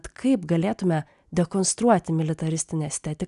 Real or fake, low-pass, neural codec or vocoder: real; 10.8 kHz; none